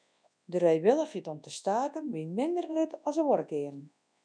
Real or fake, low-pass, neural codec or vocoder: fake; 9.9 kHz; codec, 24 kHz, 0.9 kbps, WavTokenizer, large speech release